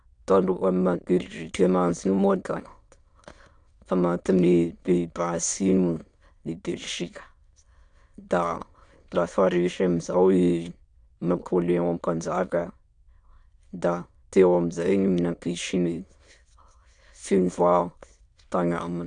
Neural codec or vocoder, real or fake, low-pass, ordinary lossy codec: autoencoder, 22.05 kHz, a latent of 192 numbers a frame, VITS, trained on many speakers; fake; 9.9 kHz; AAC, 64 kbps